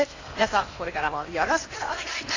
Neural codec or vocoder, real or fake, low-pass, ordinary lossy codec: codec, 16 kHz in and 24 kHz out, 0.8 kbps, FocalCodec, streaming, 65536 codes; fake; 7.2 kHz; AAC, 32 kbps